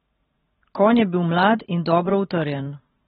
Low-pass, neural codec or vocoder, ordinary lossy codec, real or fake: 19.8 kHz; vocoder, 44.1 kHz, 128 mel bands every 512 samples, BigVGAN v2; AAC, 16 kbps; fake